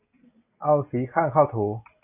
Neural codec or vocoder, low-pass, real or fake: none; 3.6 kHz; real